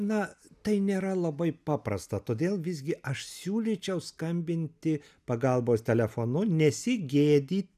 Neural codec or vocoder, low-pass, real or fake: none; 14.4 kHz; real